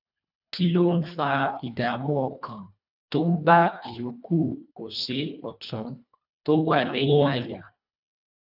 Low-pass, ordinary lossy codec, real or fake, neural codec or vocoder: 5.4 kHz; none; fake; codec, 24 kHz, 1.5 kbps, HILCodec